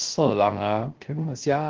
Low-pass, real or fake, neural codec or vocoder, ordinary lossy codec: 7.2 kHz; fake; codec, 16 kHz, 0.3 kbps, FocalCodec; Opus, 16 kbps